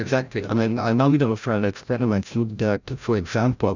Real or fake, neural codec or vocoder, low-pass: fake; codec, 16 kHz, 0.5 kbps, FreqCodec, larger model; 7.2 kHz